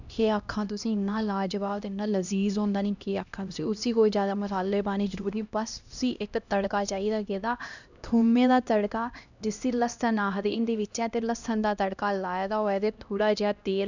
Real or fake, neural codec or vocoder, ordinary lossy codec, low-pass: fake; codec, 16 kHz, 1 kbps, X-Codec, HuBERT features, trained on LibriSpeech; none; 7.2 kHz